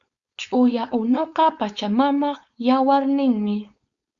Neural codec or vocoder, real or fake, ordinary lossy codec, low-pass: codec, 16 kHz, 4.8 kbps, FACodec; fake; Opus, 64 kbps; 7.2 kHz